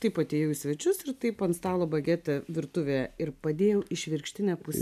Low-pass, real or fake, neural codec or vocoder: 14.4 kHz; real; none